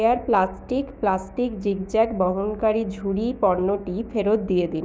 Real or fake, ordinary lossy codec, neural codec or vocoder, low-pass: fake; none; codec, 16 kHz, 6 kbps, DAC; none